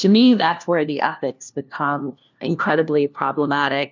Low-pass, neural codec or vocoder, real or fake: 7.2 kHz; codec, 16 kHz, 1 kbps, FunCodec, trained on LibriTTS, 50 frames a second; fake